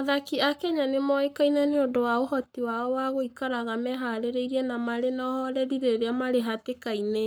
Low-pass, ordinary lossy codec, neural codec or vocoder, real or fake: none; none; codec, 44.1 kHz, 7.8 kbps, Pupu-Codec; fake